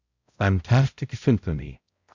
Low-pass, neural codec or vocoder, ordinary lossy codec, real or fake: 7.2 kHz; codec, 16 kHz, 0.5 kbps, X-Codec, HuBERT features, trained on balanced general audio; Opus, 64 kbps; fake